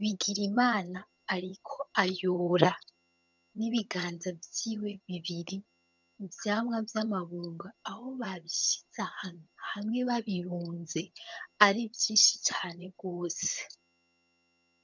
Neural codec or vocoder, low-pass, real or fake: vocoder, 22.05 kHz, 80 mel bands, HiFi-GAN; 7.2 kHz; fake